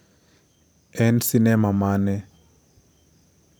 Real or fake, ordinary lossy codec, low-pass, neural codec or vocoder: fake; none; none; vocoder, 44.1 kHz, 128 mel bands every 512 samples, BigVGAN v2